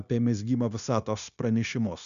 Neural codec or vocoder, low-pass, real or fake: codec, 16 kHz, 0.9 kbps, LongCat-Audio-Codec; 7.2 kHz; fake